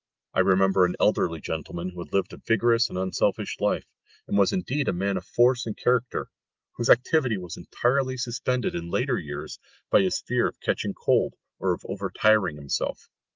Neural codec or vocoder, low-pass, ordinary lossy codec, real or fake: none; 7.2 kHz; Opus, 32 kbps; real